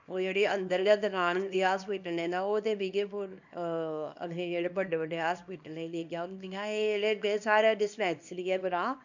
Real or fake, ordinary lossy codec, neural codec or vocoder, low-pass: fake; none; codec, 24 kHz, 0.9 kbps, WavTokenizer, small release; 7.2 kHz